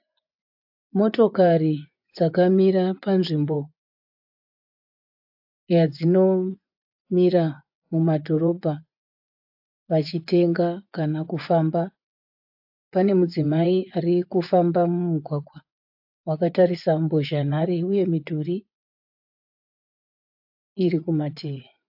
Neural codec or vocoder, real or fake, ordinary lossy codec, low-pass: vocoder, 24 kHz, 100 mel bands, Vocos; fake; AAC, 48 kbps; 5.4 kHz